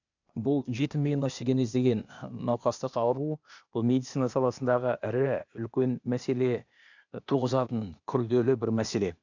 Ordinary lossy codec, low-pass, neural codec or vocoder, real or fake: none; 7.2 kHz; codec, 16 kHz, 0.8 kbps, ZipCodec; fake